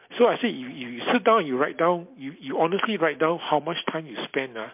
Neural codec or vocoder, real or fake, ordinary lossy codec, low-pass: none; real; MP3, 32 kbps; 3.6 kHz